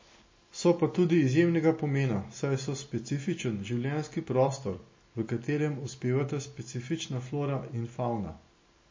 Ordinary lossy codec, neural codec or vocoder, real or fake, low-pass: MP3, 32 kbps; none; real; 7.2 kHz